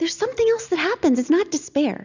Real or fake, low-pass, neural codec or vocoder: real; 7.2 kHz; none